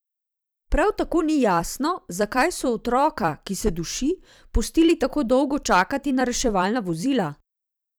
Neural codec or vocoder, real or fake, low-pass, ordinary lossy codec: vocoder, 44.1 kHz, 128 mel bands every 256 samples, BigVGAN v2; fake; none; none